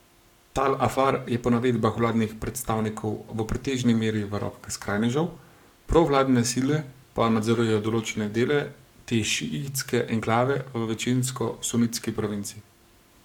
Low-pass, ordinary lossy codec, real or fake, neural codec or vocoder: 19.8 kHz; none; fake; codec, 44.1 kHz, 7.8 kbps, Pupu-Codec